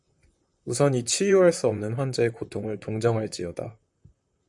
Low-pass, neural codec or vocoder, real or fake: 10.8 kHz; vocoder, 44.1 kHz, 128 mel bands, Pupu-Vocoder; fake